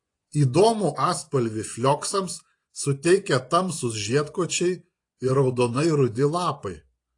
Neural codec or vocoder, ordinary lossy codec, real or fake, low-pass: vocoder, 24 kHz, 100 mel bands, Vocos; AAC, 48 kbps; fake; 10.8 kHz